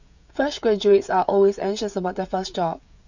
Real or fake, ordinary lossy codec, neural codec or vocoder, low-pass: fake; none; codec, 16 kHz, 16 kbps, FreqCodec, smaller model; 7.2 kHz